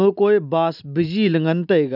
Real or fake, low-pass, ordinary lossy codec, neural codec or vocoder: real; 5.4 kHz; none; none